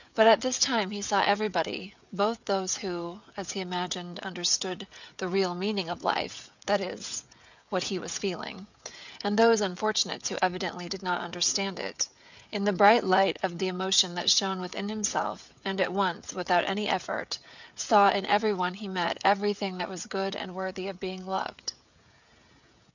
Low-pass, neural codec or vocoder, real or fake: 7.2 kHz; codec, 16 kHz, 16 kbps, FreqCodec, smaller model; fake